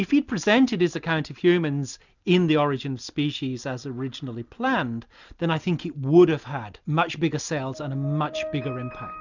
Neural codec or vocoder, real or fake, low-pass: none; real; 7.2 kHz